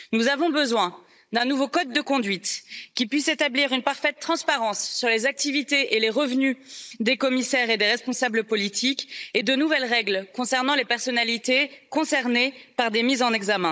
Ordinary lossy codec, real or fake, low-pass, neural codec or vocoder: none; fake; none; codec, 16 kHz, 16 kbps, FunCodec, trained on Chinese and English, 50 frames a second